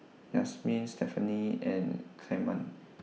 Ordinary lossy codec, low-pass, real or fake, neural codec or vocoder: none; none; real; none